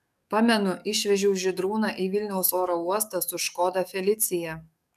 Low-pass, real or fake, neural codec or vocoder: 14.4 kHz; fake; codec, 44.1 kHz, 7.8 kbps, DAC